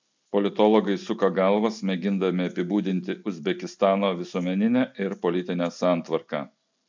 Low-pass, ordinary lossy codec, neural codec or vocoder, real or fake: 7.2 kHz; MP3, 64 kbps; none; real